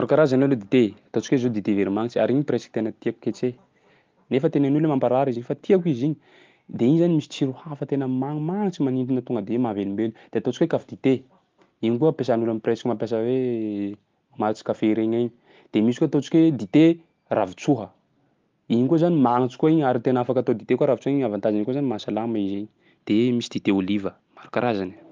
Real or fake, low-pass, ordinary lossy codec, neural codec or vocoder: real; 7.2 kHz; Opus, 32 kbps; none